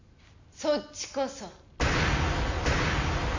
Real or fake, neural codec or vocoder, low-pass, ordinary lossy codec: real; none; 7.2 kHz; MP3, 64 kbps